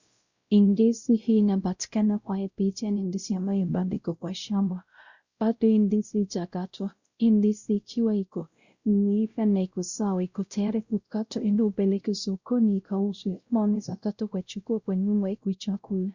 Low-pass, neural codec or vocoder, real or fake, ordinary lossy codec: 7.2 kHz; codec, 16 kHz, 0.5 kbps, X-Codec, WavLM features, trained on Multilingual LibriSpeech; fake; Opus, 64 kbps